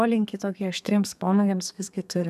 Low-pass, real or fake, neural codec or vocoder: 14.4 kHz; fake; codec, 32 kHz, 1.9 kbps, SNAC